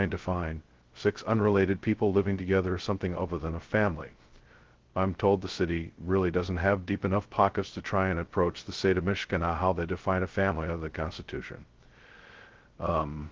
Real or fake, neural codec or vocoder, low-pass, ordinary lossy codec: fake; codec, 16 kHz, 0.2 kbps, FocalCodec; 7.2 kHz; Opus, 16 kbps